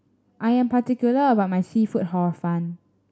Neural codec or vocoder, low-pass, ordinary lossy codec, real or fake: none; none; none; real